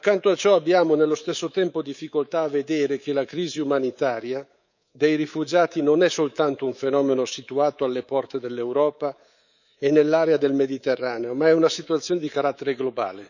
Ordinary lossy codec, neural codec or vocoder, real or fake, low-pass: none; codec, 24 kHz, 3.1 kbps, DualCodec; fake; 7.2 kHz